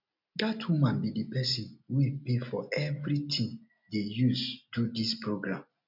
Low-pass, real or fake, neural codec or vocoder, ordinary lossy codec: 5.4 kHz; real; none; none